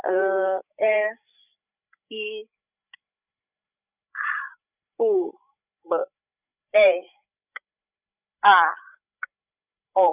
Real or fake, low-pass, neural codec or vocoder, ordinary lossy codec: fake; 3.6 kHz; codec, 16 kHz, 16 kbps, FreqCodec, larger model; none